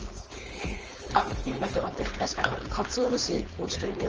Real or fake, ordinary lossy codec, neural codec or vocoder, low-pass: fake; Opus, 24 kbps; codec, 16 kHz, 4.8 kbps, FACodec; 7.2 kHz